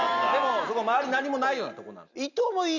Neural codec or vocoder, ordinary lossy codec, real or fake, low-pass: none; none; real; 7.2 kHz